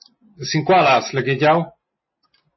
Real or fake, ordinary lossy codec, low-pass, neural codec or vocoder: real; MP3, 24 kbps; 7.2 kHz; none